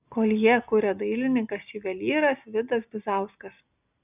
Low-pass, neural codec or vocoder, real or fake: 3.6 kHz; none; real